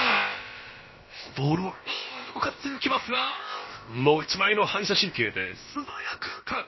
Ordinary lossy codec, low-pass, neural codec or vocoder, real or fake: MP3, 24 kbps; 7.2 kHz; codec, 16 kHz, about 1 kbps, DyCAST, with the encoder's durations; fake